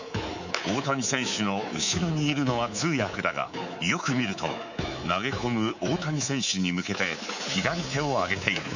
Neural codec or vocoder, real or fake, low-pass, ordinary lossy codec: codec, 24 kHz, 3.1 kbps, DualCodec; fake; 7.2 kHz; none